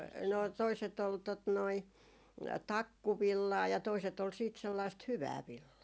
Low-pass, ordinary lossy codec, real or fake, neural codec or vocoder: none; none; real; none